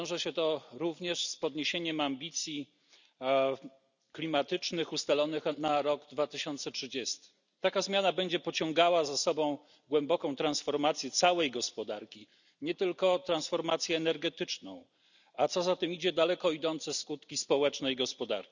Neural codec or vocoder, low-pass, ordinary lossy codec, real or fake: none; 7.2 kHz; none; real